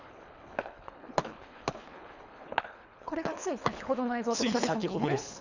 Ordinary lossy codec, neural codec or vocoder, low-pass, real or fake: none; codec, 24 kHz, 3 kbps, HILCodec; 7.2 kHz; fake